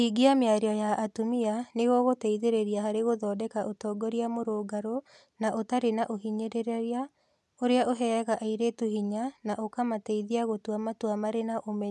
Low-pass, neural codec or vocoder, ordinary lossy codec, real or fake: none; none; none; real